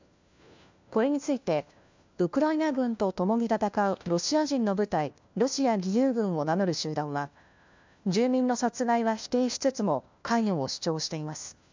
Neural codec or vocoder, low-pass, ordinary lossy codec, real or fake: codec, 16 kHz, 1 kbps, FunCodec, trained on LibriTTS, 50 frames a second; 7.2 kHz; MP3, 64 kbps; fake